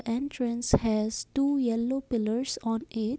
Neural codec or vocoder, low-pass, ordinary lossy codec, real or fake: none; none; none; real